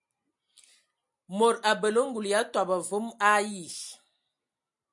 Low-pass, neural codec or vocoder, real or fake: 10.8 kHz; none; real